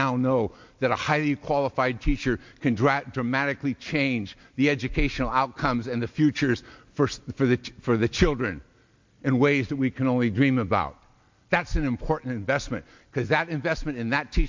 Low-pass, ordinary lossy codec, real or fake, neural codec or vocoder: 7.2 kHz; MP3, 48 kbps; real; none